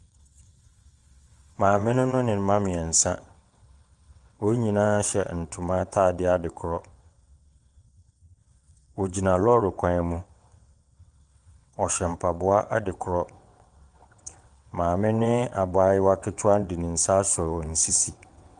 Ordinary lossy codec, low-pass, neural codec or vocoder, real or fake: Opus, 32 kbps; 9.9 kHz; vocoder, 22.05 kHz, 80 mel bands, WaveNeXt; fake